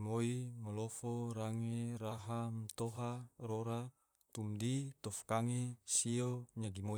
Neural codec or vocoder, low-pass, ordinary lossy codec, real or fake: vocoder, 44.1 kHz, 128 mel bands, Pupu-Vocoder; none; none; fake